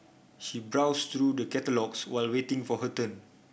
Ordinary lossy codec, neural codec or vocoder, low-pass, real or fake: none; none; none; real